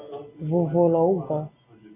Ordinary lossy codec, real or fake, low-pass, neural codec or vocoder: MP3, 24 kbps; real; 3.6 kHz; none